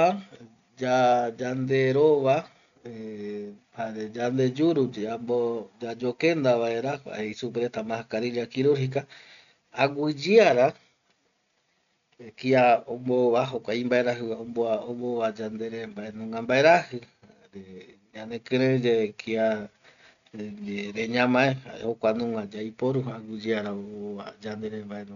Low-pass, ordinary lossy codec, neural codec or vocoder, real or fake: 7.2 kHz; none; none; real